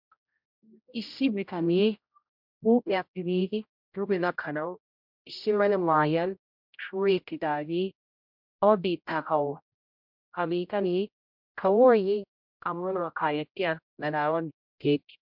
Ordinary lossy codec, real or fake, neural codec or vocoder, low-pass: MP3, 48 kbps; fake; codec, 16 kHz, 0.5 kbps, X-Codec, HuBERT features, trained on general audio; 5.4 kHz